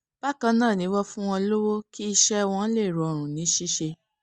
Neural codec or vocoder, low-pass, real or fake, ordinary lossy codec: none; 10.8 kHz; real; Opus, 64 kbps